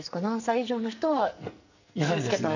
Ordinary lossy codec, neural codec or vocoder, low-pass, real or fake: none; codec, 44.1 kHz, 2.6 kbps, SNAC; 7.2 kHz; fake